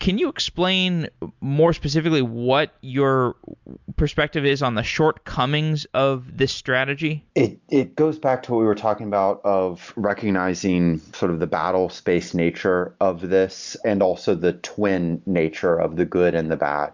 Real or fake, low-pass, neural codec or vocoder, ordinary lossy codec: real; 7.2 kHz; none; MP3, 64 kbps